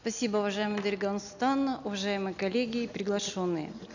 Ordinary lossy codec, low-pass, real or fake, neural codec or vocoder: none; 7.2 kHz; real; none